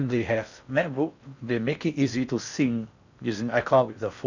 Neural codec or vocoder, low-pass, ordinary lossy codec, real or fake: codec, 16 kHz in and 24 kHz out, 0.6 kbps, FocalCodec, streaming, 4096 codes; 7.2 kHz; none; fake